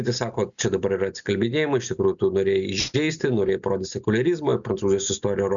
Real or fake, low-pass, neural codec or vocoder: real; 7.2 kHz; none